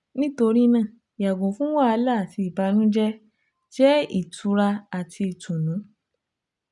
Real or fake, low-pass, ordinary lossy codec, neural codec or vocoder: real; 10.8 kHz; none; none